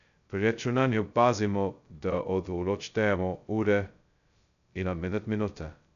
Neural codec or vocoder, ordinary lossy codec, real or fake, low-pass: codec, 16 kHz, 0.2 kbps, FocalCodec; none; fake; 7.2 kHz